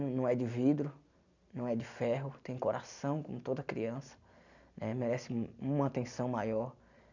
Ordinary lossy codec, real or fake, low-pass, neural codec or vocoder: none; real; 7.2 kHz; none